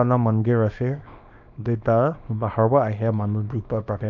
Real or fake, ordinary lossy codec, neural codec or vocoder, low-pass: fake; MP3, 64 kbps; codec, 24 kHz, 0.9 kbps, WavTokenizer, small release; 7.2 kHz